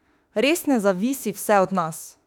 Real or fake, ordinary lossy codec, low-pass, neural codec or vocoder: fake; none; 19.8 kHz; autoencoder, 48 kHz, 32 numbers a frame, DAC-VAE, trained on Japanese speech